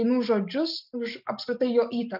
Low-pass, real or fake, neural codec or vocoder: 5.4 kHz; real; none